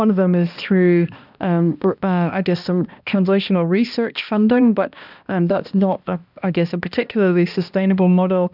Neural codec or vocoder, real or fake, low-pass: codec, 16 kHz, 1 kbps, X-Codec, HuBERT features, trained on balanced general audio; fake; 5.4 kHz